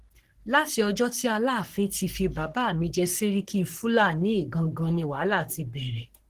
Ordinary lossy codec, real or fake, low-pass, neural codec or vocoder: Opus, 16 kbps; fake; 14.4 kHz; codec, 44.1 kHz, 3.4 kbps, Pupu-Codec